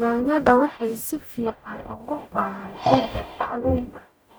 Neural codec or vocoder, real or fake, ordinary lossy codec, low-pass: codec, 44.1 kHz, 0.9 kbps, DAC; fake; none; none